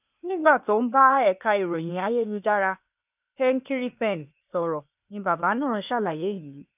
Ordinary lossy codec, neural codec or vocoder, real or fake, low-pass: none; codec, 16 kHz, 0.8 kbps, ZipCodec; fake; 3.6 kHz